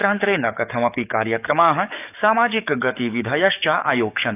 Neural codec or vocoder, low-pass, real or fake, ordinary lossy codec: codec, 44.1 kHz, 7.8 kbps, DAC; 3.6 kHz; fake; none